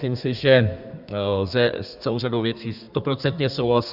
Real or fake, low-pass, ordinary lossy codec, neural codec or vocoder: fake; 5.4 kHz; Opus, 64 kbps; codec, 32 kHz, 1.9 kbps, SNAC